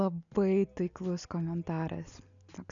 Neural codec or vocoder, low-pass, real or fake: none; 7.2 kHz; real